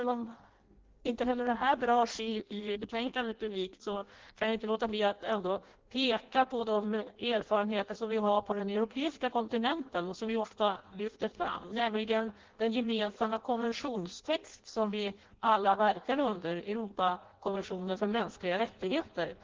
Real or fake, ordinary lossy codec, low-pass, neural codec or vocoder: fake; Opus, 16 kbps; 7.2 kHz; codec, 16 kHz in and 24 kHz out, 0.6 kbps, FireRedTTS-2 codec